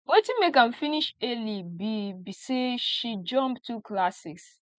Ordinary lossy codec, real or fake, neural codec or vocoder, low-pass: none; real; none; none